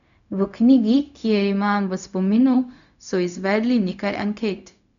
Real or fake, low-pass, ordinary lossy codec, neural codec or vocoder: fake; 7.2 kHz; none; codec, 16 kHz, 0.4 kbps, LongCat-Audio-Codec